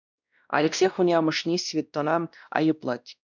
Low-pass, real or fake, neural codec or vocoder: 7.2 kHz; fake; codec, 16 kHz, 1 kbps, X-Codec, WavLM features, trained on Multilingual LibriSpeech